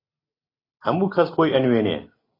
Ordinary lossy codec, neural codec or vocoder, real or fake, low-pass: AAC, 24 kbps; none; real; 5.4 kHz